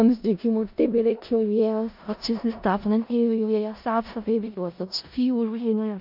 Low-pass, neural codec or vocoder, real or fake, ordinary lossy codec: 5.4 kHz; codec, 16 kHz in and 24 kHz out, 0.4 kbps, LongCat-Audio-Codec, four codebook decoder; fake; MP3, 48 kbps